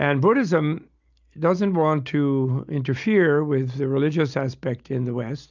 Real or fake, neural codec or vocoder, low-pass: real; none; 7.2 kHz